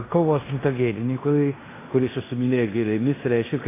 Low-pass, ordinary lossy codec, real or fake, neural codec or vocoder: 3.6 kHz; AAC, 16 kbps; fake; codec, 16 kHz in and 24 kHz out, 0.9 kbps, LongCat-Audio-Codec, fine tuned four codebook decoder